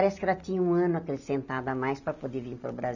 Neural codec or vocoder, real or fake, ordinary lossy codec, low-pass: none; real; none; 7.2 kHz